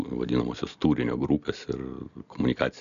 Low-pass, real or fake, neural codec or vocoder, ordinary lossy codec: 7.2 kHz; real; none; AAC, 96 kbps